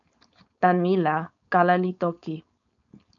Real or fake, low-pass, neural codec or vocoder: fake; 7.2 kHz; codec, 16 kHz, 4.8 kbps, FACodec